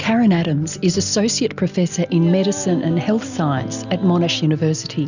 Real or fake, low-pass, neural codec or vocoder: real; 7.2 kHz; none